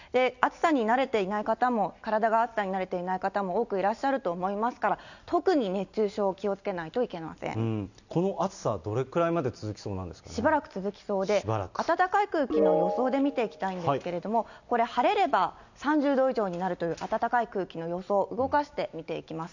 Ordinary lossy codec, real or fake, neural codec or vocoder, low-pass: none; real; none; 7.2 kHz